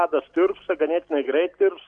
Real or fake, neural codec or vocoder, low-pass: real; none; 9.9 kHz